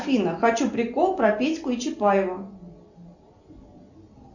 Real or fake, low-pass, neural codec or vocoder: real; 7.2 kHz; none